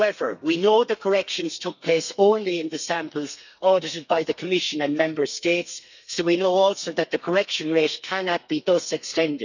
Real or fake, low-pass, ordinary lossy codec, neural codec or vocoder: fake; 7.2 kHz; none; codec, 32 kHz, 1.9 kbps, SNAC